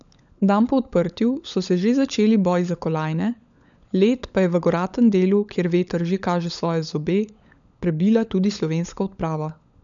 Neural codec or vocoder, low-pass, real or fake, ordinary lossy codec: codec, 16 kHz, 16 kbps, FunCodec, trained on LibriTTS, 50 frames a second; 7.2 kHz; fake; none